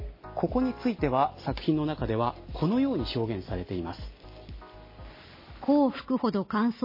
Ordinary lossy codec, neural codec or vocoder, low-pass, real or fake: MP3, 24 kbps; none; 5.4 kHz; real